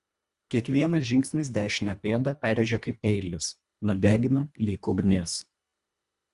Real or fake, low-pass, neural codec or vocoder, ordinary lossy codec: fake; 10.8 kHz; codec, 24 kHz, 1.5 kbps, HILCodec; Opus, 64 kbps